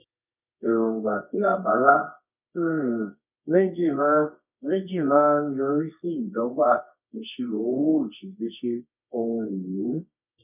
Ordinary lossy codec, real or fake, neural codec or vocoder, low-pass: none; fake; codec, 24 kHz, 0.9 kbps, WavTokenizer, medium music audio release; 3.6 kHz